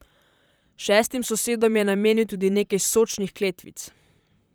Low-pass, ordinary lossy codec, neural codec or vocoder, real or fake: none; none; vocoder, 44.1 kHz, 128 mel bands, Pupu-Vocoder; fake